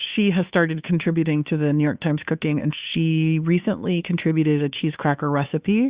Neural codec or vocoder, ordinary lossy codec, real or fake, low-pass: codec, 16 kHz, 2 kbps, FunCodec, trained on Chinese and English, 25 frames a second; Opus, 64 kbps; fake; 3.6 kHz